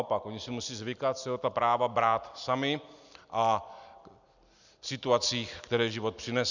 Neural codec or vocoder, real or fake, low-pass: none; real; 7.2 kHz